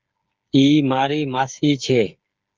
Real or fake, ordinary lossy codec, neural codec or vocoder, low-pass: fake; Opus, 32 kbps; codec, 16 kHz, 8 kbps, FreqCodec, smaller model; 7.2 kHz